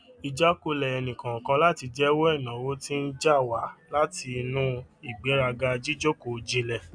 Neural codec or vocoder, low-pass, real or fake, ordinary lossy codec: none; 9.9 kHz; real; none